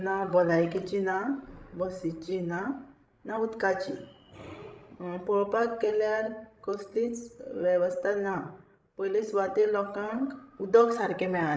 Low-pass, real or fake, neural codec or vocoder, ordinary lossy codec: none; fake; codec, 16 kHz, 16 kbps, FreqCodec, larger model; none